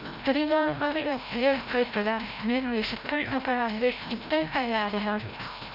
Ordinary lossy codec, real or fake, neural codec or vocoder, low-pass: none; fake; codec, 16 kHz, 0.5 kbps, FreqCodec, larger model; 5.4 kHz